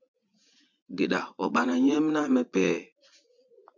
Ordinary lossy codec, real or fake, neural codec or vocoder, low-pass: MP3, 64 kbps; fake; vocoder, 44.1 kHz, 80 mel bands, Vocos; 7.2 kHz